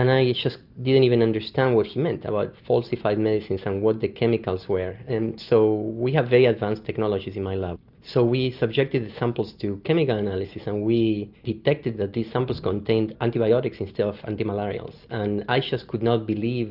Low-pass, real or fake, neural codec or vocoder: 5.4 kHz; real; none